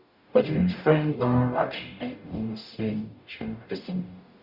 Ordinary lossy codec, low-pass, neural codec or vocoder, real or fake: Opus, 64 kbps; 5.4 kHz; codec, 44.1 kHz, 0.9 kbps, DAC; fake